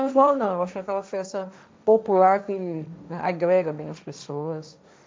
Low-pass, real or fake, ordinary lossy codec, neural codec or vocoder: none; fake; none; codec, 16 kHz, 1.1 kbps, Voila-Tokenizer